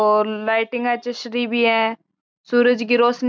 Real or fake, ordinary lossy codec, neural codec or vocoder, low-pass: real; none; none; none